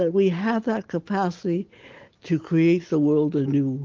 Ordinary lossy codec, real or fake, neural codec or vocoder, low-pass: Opus, 16 kbps; real; none; 7.2 kHz